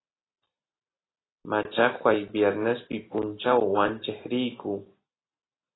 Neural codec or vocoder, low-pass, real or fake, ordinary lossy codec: none; 7.2 kHz; real; AAC, 16 kbps